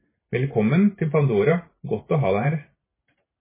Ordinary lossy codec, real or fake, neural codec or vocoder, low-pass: MP3, 16 kbps; fake; vocoder, 44.1 kHz, 128 mel bands every 512 samples, BigVGAN v2; 3.6 kHz